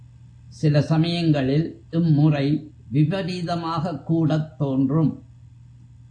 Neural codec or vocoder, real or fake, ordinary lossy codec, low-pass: none; real; AAC, 48 kbps; 9.9 kHz